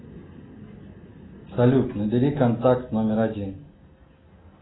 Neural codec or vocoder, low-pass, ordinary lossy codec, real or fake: none; 7.2 kHz; AAC, 16 kbps; real